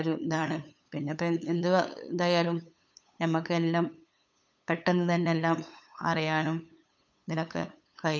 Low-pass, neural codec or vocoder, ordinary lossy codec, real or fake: none; codec, 16 kHz, 8 kbps, FunCodec, trained on LibriTTS, 25 frames a second; none; fake